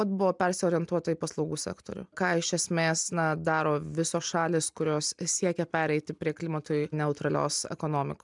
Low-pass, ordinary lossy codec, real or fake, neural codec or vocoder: 10.8 kHz; MP3, 96 kbps; real; none